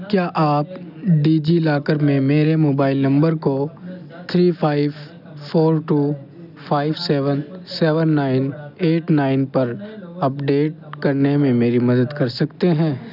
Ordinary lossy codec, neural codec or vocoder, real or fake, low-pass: none; none; real; 5.4 kHz